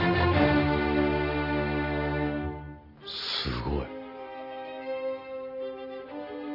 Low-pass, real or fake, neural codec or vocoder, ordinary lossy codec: 5.4 kHz; real; none; none